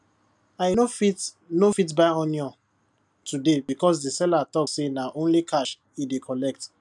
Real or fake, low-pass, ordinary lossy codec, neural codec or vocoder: real; 10.8 kHz; none; none